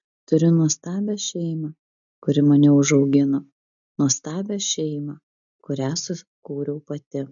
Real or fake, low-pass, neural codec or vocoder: real; 7.2 kHz; none